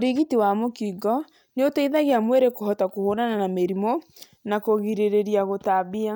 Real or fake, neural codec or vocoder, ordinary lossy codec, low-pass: real; none; none; none